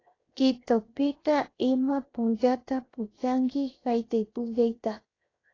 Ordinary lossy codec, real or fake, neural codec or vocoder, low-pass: AAC, 32 kbps; fake; codec, 16 kHz, 0.7 kbps, FocalCodec; 7.2 kHz